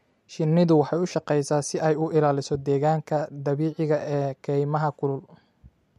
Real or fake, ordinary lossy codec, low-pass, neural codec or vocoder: real; MP3, 64 kbps; 14.4 kHz; none